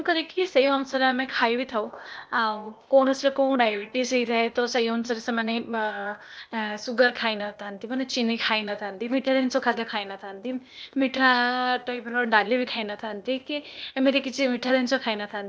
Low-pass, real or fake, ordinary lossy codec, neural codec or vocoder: none; fake; none; codec, 16 kHz, 0.8 kbps, ZipCodec